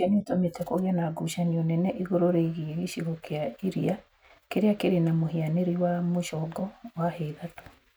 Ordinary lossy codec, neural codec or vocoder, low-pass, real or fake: none; none; none; real